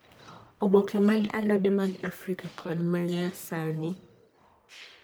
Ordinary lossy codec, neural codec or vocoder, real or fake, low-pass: none; codec, 44.1 kHz, 1.7 kbps, Pupu-Codec; fake; none